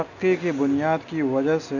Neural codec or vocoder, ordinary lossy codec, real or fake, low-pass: none; none; real; 7.2 kHz